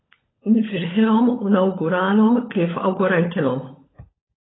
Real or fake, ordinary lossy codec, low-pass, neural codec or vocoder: fake; AAC, 16 kbps; 7.2 kHz; codec, 16 kHz, 16 kbps, FunCodec, trained on LibriTTS, 50 frames a second